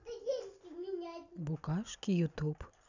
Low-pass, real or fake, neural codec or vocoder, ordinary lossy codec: 7.2 kHz; real; none; none